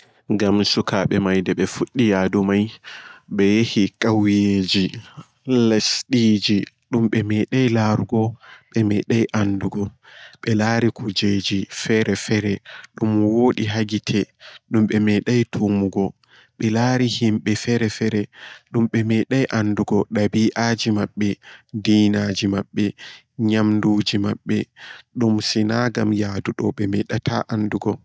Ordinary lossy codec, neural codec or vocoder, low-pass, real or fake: none; none; none; real